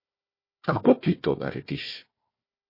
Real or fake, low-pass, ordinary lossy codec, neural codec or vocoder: fake; 5.4 kHz; MP3, 24 kbps; codec, 16 kHz, 1 kbps, FunCodec, trained on Chinese and English, 50 frames a second